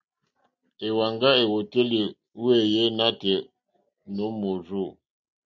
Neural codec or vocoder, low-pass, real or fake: none; 7.2 kHz; real